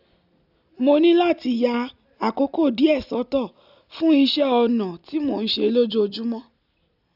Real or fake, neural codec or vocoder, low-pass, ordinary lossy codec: real; none; 5.4 kHz; none